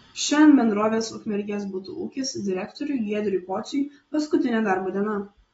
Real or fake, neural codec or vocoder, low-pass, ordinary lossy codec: real; none; 19.8 kHz; AAC, 24 kbps